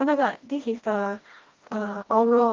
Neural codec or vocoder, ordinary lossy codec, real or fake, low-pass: codec, 16 kHz, 1 kbps, FreqCodec, smaller model; Opus, 32 kbps; fake; 7.2 kHz